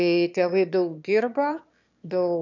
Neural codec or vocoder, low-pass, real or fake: autoencoder, 22.05 kHz, a latent of 192 numbers a frame, VITS, trained on one speaker; 7.2 kHz; fake